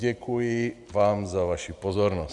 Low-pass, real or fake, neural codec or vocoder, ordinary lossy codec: 10.8 kHz; real; none; MP3, 96 kbps